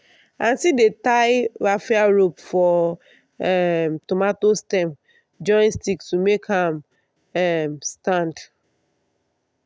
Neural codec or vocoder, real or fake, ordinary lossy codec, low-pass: none; real; none; none